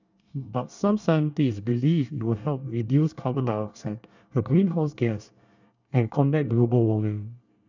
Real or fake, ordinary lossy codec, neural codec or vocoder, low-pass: fake; none; codec, 24 kHz, 1 kbps, SNAC; 7.2 kHz